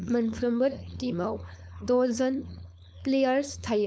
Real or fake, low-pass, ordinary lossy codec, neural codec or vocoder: fake; none; none; codec, 16 kHz, 4.8 kbps, FACodec